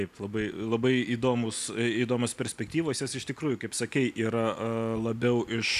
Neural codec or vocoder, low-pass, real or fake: none; 14.4 kHz; real